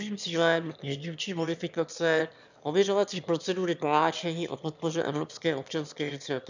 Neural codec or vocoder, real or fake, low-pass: autoencoder, 22.05 kHz, a latent of 192 numbers a frame, VITS, trained on one speaker; fake; 7.2 kHz